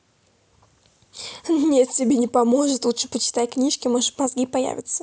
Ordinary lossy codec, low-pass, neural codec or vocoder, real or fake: none; none; none; real